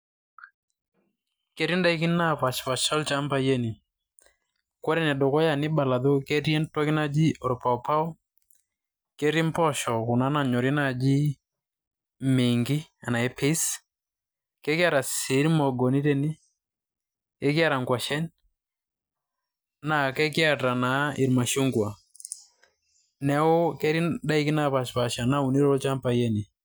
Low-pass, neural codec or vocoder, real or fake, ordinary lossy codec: none; none; real; none